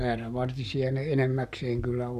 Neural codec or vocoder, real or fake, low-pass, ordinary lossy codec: codec, 44.1 kHz, 7.8 kbps, Pupu-Codec; fake; 14.4 kHz; none